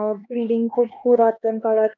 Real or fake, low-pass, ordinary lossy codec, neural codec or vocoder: fake; 7.2 kHz; none; codec, 16 kHz, 2 kbps, X-Codec, HuBERT features, trained on LibriSpeech